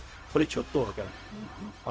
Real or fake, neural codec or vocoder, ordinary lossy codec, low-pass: fake; codec, 16 kHz, 0.4 kbps, LongCat-Audio-Codec; none; none